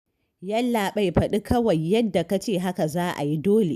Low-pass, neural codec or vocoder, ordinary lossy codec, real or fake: 14.4 kHz; none; none; real